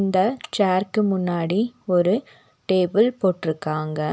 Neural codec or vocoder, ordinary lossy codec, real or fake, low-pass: none; none; real; none